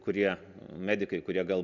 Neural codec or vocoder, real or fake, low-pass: none; real; 7.2 kHz